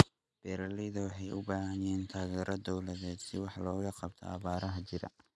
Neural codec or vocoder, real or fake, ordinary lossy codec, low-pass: none; real; none; none